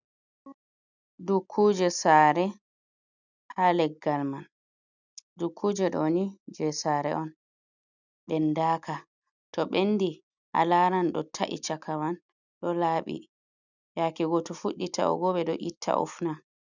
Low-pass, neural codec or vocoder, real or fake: 7.2 kHz; none; real